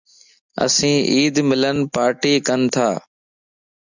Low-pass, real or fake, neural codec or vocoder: 7.2 kHz; real; none